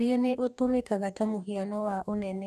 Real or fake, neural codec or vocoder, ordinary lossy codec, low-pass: fake; codec, 44.1 kHz, 2.6 kbps, DAC; none; 14.4 kHz